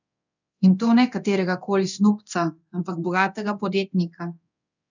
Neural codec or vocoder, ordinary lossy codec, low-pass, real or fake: codec, 24 kHz, 0.9 kbps, DualCodec; none; 7.2 kHz; fake